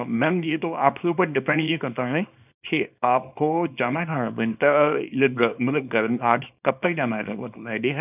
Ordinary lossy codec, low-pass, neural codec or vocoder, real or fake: none; 3.6 kHz; codec, 24 kHz, 0.9 kbps, WavTokenizer, small release; fake